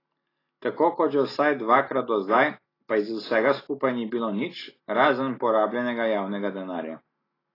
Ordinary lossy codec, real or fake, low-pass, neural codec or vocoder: AAC, 24 kbps; real; 5.4 kHz; none